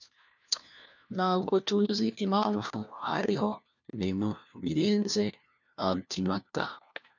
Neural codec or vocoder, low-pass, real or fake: codec, 16 kHz, 1 kbps, FunCodec, trained on LibriTTS, 50 frames a second; 7.2 kHz; fake